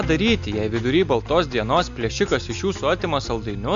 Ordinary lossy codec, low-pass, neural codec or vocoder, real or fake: AAC, 64 kbps; 7.2 kHz; none; real